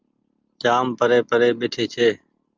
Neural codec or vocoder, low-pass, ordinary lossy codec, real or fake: none; 7.2 kHz; Opus, 32 kbps; real